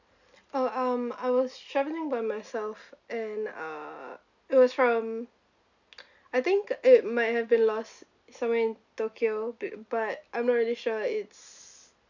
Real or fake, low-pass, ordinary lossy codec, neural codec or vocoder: real; 7.2 kHz; none; none